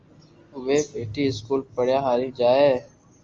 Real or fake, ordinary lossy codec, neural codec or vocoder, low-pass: real; Opus, 32 kbps; none; 7.2 kHz